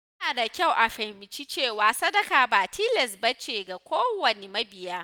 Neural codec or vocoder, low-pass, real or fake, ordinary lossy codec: none; none; real; none